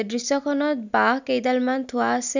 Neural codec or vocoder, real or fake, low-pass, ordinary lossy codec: none; real; 7.2 kHz; none